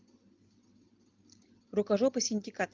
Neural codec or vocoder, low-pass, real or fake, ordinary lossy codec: none; 7.2 kHz; real; Opus, 32 kbps